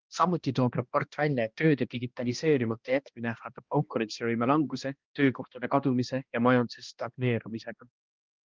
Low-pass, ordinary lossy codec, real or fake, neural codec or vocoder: 7.2 kHz; Opus, 32 kbps; fake; codec, 16 kHz, 1 kbps, X-Codec, HuBERT features, trained on balanced general audio